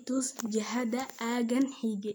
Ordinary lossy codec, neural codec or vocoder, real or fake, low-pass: none; none; real; none